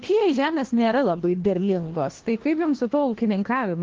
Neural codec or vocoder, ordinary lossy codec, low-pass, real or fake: codec, 16 kHz, 1 kbps, FunCodec, trained on LibriTTS, 50 frames a second; Opus, 16 kbps; 7.2 kHz; fake